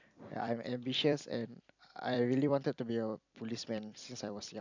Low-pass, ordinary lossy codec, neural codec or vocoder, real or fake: 7.2 kHz; none; vocoder, 44.1 kHz, 128 mel bands every 256 samples, BigVGAN v2; fake